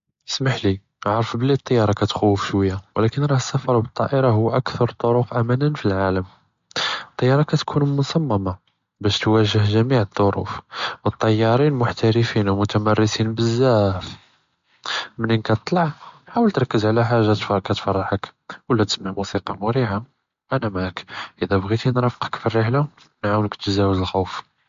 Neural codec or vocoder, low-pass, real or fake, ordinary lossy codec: none; 7.2 kHz; real; MP3, 48 kbps